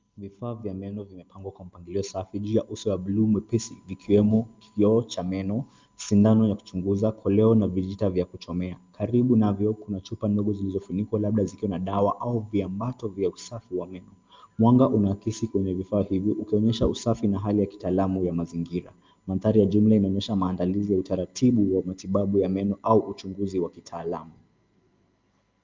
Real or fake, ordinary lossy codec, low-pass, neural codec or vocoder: real; Opus, 32 kbps; 7.2 kHz; none